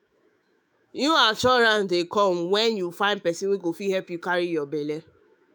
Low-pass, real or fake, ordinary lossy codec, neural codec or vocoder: none; fake; none; autoencoder, 48 kHz, 128 numbers a frame, DAC-VAE, trained on Japanese speech